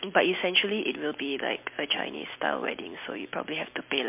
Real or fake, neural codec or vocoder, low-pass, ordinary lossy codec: real; none; 3.6 kHz; MP3, 32 kbps